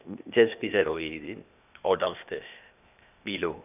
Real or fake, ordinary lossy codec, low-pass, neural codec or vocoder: fake; none; 3.6 kHz; codec, 16 kHz, 0.8 kbps, ZipCodec